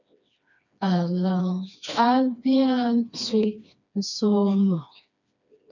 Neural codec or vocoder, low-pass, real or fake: codec, 16 kHz, 2 kbps, FreqCodec, smaller model; 7.2 kHz; fake